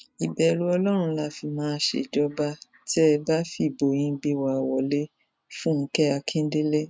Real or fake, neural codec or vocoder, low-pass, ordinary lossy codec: real; none; none; none